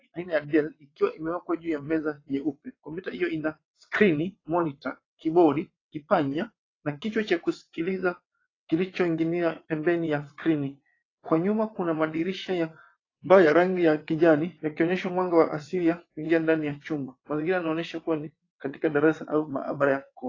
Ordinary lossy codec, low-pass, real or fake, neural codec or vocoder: AAC, 32 kbps; 7.2 kHz; fake; vocoder, 22.05 kHz, 80 mel bands, WaveNeXt